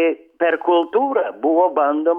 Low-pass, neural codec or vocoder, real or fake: 19.8 kHz; autoencoder, 48 kHz, 128 numbers a frame, DAC-VAE, trained on Japanese speech; fake